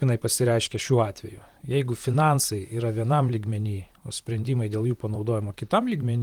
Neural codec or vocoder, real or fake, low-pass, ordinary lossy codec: vocoder, 44.1 kHz, 128 mel bands, Pupu-Vocoder; fake; 19.8 kHz; Opus, 24 kbps